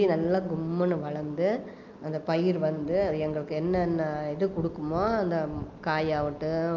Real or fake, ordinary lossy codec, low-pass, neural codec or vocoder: real; Opus, 24 kbps; 7.2 kHz; none